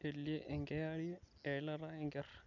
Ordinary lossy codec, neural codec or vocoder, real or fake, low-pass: none; none; real; 7.2 kHz